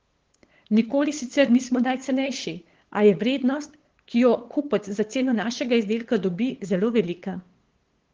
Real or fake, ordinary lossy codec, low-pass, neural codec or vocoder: fake; Opus, 16 kbps; 7.2 kHz; codec, 16 kHz, 8 kbps, FunCodec, trained on LibriTTS, 25 frames a second